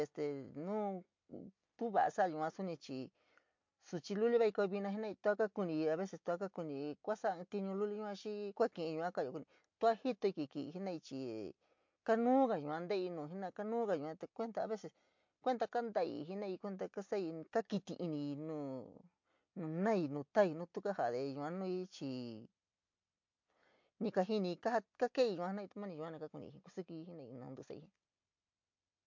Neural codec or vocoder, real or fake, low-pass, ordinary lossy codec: none; real; 7.2 kHz; MP3, 48 kbps